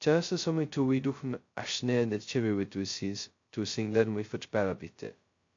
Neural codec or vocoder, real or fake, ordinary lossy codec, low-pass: codec, 16 kHz, 0.2 kbps, FocalCodec; fake; AAC, 48 kbps; 7.2 kHz